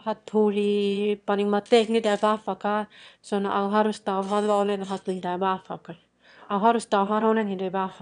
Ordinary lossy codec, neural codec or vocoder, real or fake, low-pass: none; autoencoder, 22.05 kHz, a latent of 192 numbers a frame, VITS, trained on one speaker; fake; 9.9 kHz